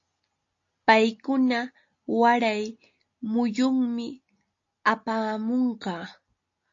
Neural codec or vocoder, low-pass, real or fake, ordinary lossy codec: none; 7.2 kHz; real; AAC, 48 kbps